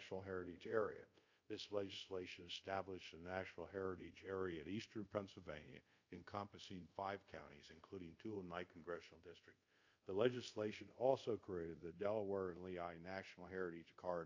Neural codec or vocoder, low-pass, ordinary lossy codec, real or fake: codec, 24 kHz, 0.5 kbps, DualCodec; 7.2 kHz; AAC, 48 kbps; fake